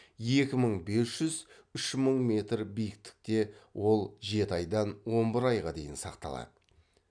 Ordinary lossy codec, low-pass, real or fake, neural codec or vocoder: none; 9.9 kHz; real; none